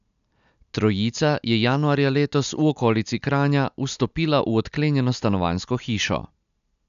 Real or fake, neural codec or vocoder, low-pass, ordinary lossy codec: real; none; 7.2 kHz; none